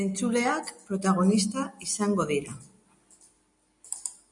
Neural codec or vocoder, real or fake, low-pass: none; real; 10.8 kHz